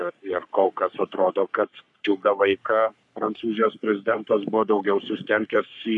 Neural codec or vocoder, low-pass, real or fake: codec, 44.1 kHz, 3.4 kbps, Pupu-Codec; 10.8 kHz; fake